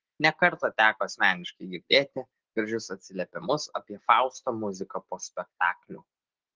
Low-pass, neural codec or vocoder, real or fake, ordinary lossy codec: 7.2 kHz; none; real; Opus, 16 kbps